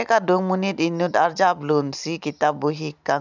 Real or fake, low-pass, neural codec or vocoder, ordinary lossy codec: real; 7.2 kHz; none; none